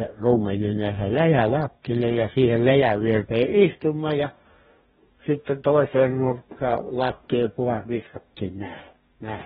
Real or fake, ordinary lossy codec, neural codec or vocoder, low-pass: fake; AAC, 16 kbps; codec, 44.1 kHz, 2.6 kbps, DAC; 19.8 kHz